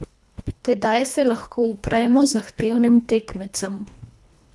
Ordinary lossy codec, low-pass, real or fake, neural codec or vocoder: none; none; fake; codec, 24 kHz, 1.5 kbps, HILCodec